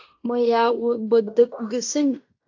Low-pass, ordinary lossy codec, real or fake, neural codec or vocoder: 7.2 kHz; AAC, 48 kbps; fake; codec, 16 kHz in and 24 kHz out, 0.9 kbps, LongCat-Audio-Codec, fine tuned four codebook decoder